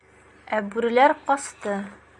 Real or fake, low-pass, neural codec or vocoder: real; 10.8 kHz; none